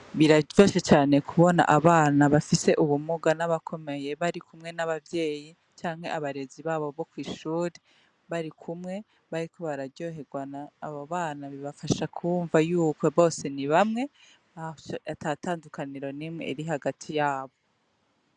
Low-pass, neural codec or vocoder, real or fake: 9.9 kHz; none; real